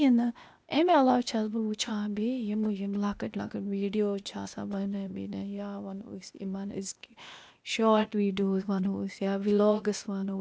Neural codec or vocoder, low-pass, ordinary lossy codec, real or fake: codec, 16 kHz, 0.8 kbps, ZipCodec; none; none; fake